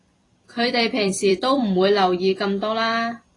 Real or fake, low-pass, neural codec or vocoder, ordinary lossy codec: fake; 10.8 kHz; vocoder, 44.1 kHz, 128 mel bands every 256 samples, BigVGAN v2; AAC, 32 kbps